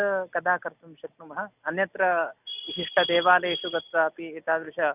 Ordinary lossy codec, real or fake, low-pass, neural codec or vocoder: none; real; 3.6 kHz; none